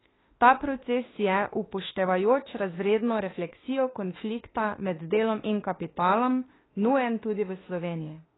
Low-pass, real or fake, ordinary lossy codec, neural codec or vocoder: 7.2 kHz; fake; AAC, 16 kbps; autoencoder, 48 kHz, 32 numbers a frame, DAC-VAE, trained on Japanese speech